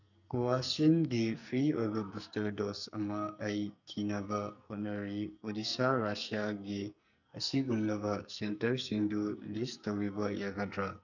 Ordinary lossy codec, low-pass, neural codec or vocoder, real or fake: none; 7.2 kHz; codec, 44.1 kHz, 2.6 kbps, SNAC; fake